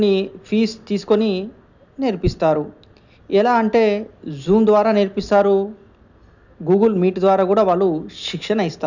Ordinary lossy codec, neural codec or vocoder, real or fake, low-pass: none; none; real; 7.2 kHz